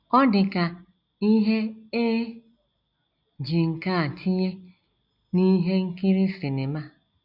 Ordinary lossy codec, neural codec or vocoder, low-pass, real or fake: none; none; 5.4 kHz; real